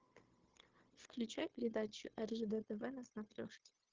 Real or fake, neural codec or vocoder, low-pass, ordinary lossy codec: fake; codec, 16 kHz, 0.9 kbps, LongCat-Audio-Codec; 7.2 kHz; Opus, 16 kbps